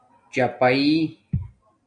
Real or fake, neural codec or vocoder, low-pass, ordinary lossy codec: real; none; 9.9 kHz; MP3, 96 kbps